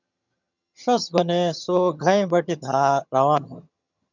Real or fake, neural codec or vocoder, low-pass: fake; vocoder, 22.05 kHz, 80 mel bands, HiFi-GAN; 7.2 kHz